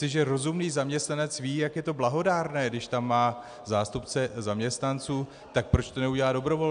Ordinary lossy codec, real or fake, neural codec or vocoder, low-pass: AAC, 96 kbps; real; none; 9.9 kHz